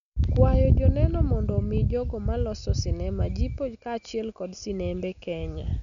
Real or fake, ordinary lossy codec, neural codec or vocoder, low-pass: real; none; none; 7.2 kHz